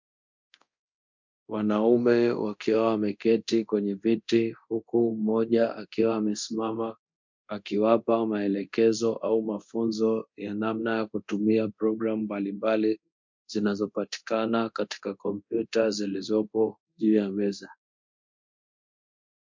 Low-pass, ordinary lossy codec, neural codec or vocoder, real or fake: 7.2 kHz; MP3, 48 kbps; codec, 24 kHz, 0.9 kbps, DualCodec; fake